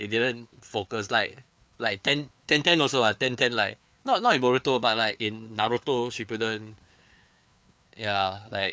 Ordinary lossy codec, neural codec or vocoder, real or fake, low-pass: none; codec, 16 kHz, 4 kbps, FreqCodec, larger model; fake; none